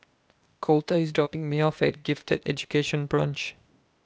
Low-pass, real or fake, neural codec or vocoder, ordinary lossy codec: none; fake; codec, 16 kHz, 0.8 kbps, ZipCodec; none